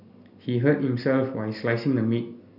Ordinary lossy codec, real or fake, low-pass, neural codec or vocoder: none; real; 5.4 kHz; none